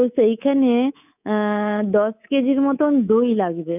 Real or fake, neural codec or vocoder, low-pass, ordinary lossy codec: fake; codec, 24 kHz, 3.1 kbps, DualCodec; 3.6 kHz; none